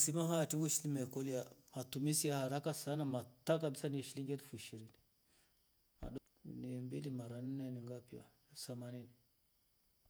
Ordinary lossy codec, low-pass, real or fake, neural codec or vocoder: none; none; fake; vocoder, 48 kHz, 128 mel bands, Vocos